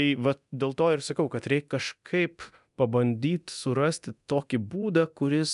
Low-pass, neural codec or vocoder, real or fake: 10.8 kHz; codec, 24 kHz, 0.9 kbps, DualCodec; fake